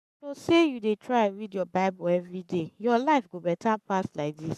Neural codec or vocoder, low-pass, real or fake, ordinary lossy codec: codec, 44.1 kHz, 7.8 kbps, Pupu-Codec; 14.4 kHz; fake; none